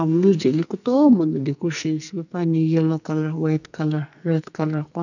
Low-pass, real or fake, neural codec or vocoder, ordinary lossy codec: 7.2 kHz; fake; codec, 44.1 kHz, 2.6 kbps, SNAC; none